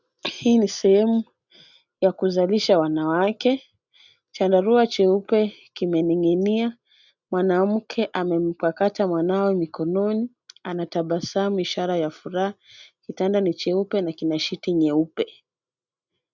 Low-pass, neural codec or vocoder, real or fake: 7.2 kHz; none; real